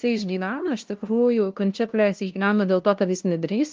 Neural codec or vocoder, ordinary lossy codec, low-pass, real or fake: codec, 16 kHz, 0.5 kbps, FunCodec, trained on LibriTTS, 25 frames a second; Opus, 24 kbps; 7.2 kHz; fake